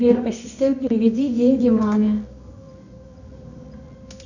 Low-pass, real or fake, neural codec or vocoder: 7.2 kHz; fake; codec, 24 kHz, 0.9 kbps, WavTokenizer, medium music audio release